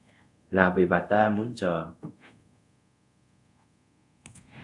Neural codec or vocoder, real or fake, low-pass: codec, 24 kHz, 0.5 kbps, DualCodec; fake; 10.8 kHz